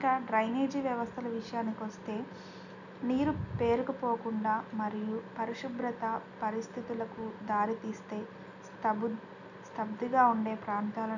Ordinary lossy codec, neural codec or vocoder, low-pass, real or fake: none; none; 7.2 kHz; real